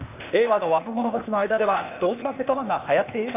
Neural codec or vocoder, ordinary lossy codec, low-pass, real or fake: codec, 16 kHz, 0.8 kbps, ZipCodec; none; 3.6 kHz; fake